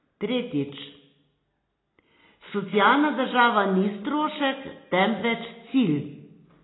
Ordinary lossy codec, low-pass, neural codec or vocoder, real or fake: AAC, 16 kbps; 7.2 kHz; none; real